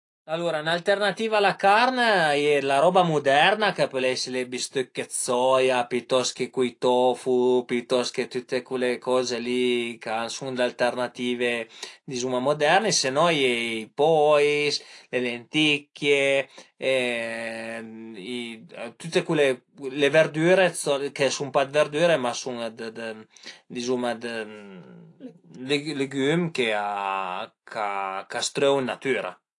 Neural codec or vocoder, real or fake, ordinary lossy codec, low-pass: none; real; AAC, 48 kbps; 10.8 kHz